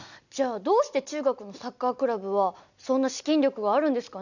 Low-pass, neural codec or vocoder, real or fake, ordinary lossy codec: 7.2 kHz; none; real; none